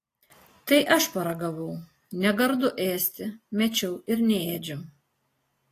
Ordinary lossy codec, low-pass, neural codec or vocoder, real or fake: AAC, 48 kbps; 14.4 kHz; none; real